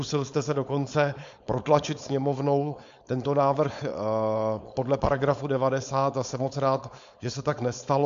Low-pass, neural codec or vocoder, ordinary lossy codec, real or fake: 7.2 kHz; codec, 16 kHz, 4.8 kbps, FACodec; AAC, 64 kbps; fake